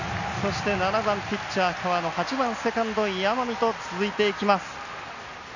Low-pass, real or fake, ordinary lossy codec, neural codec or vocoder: 7.2 kHz; real; none; none